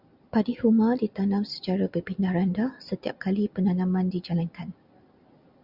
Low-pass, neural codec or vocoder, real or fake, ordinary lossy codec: 5.4 kHz; none; real; Opus, 64 kbps